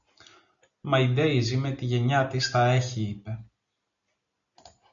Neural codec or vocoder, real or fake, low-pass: none; real; 7.2 kHz